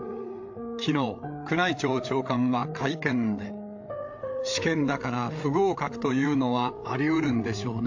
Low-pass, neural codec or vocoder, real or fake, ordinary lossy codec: 7.2 kHz; codec, 16 kHz, 8 kbps, FreqCodec, larger model; fake; AAC, 48 kbps